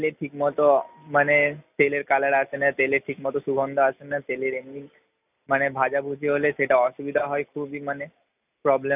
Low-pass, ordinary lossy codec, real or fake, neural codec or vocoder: 3.6 kHz; none; real; none